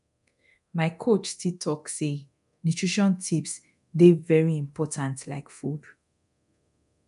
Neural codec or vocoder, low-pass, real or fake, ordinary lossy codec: codec, 24 kHz, 0.9 kbps, DualCodec; 10.8 kHz; fake; none